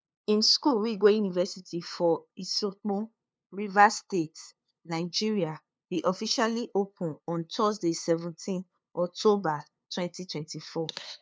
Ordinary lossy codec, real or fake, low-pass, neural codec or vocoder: none; fake; none; codec, 16 kHz, 2 kbps, FunCodec, trained on LibriTTS, 25 frames a second